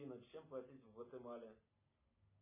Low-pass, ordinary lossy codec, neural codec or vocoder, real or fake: 3.6 kHz; MP3, 16 kbps; none; real